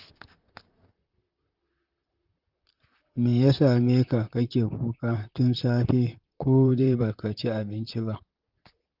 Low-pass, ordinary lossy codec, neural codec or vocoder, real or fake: 5.4 kHz; Opus, 24 kbps; vocoder, 44.1 kHz, 128 mel bands, Pupu-Vocoder; fake